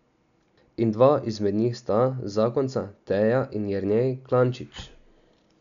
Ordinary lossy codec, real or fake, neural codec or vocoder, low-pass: none; real; none; 7.2 kHz